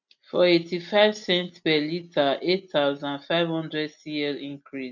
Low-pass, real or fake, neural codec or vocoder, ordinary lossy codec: 7.2 kHz; real; none; none